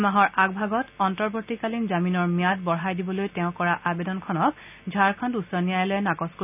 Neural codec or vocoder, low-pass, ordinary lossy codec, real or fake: none; 3.6 kHz; none; real